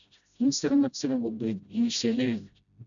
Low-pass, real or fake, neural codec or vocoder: 7.2 kHz; fake; codec, 16 kHz, 0.5 kbps, FreqCodec, smaller model